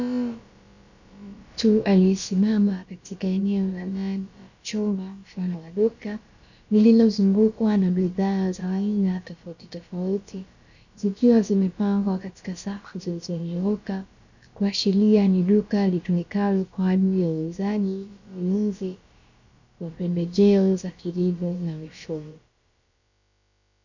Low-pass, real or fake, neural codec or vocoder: 7.2 kHz; fake; codec, 16 kHz, about 1 kbps, DyCAST, with the encoder's durations